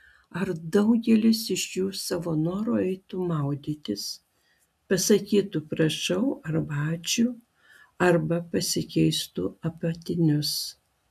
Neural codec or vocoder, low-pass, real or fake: none; 14.4 kHz; real